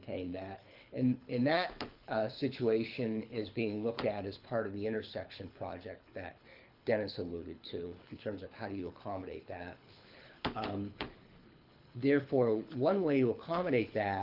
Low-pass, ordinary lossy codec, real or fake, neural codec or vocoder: 5.4 kHz; Opus, 24 kbps; fake; codec, 24 kHz, 6 kbps, HILCodec